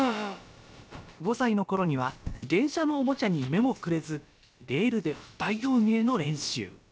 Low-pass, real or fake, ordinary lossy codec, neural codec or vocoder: none; fake; none; codec, 16 kHz, about 1 kbps, DyCAST, with the encoder's durations